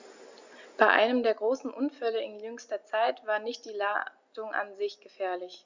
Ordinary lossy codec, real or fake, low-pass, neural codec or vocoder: Opus, 64 kbps; real; 7.2 kHz; none